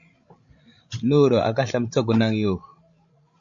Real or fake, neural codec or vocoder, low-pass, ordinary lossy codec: fake; codec, 16 kHz, 16 kbps, FreqCodec, larger model; 7.2 kHz; MP3, 48 kbps